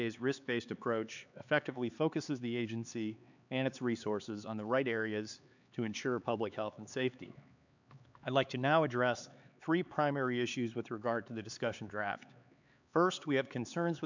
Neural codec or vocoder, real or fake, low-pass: codec, 16 kHz, 4 kbps, X-Codec, HuBERT features, trained on LibriSpeech; fake; 7.2 kHz